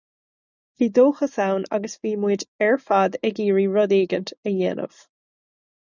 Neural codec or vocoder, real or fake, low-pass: none; real; 7.2 kHz